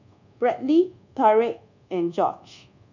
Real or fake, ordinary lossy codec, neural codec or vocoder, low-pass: fake; none; codec, 24 kHz, 1.2 kbps, DualCodec; 7.2 kHz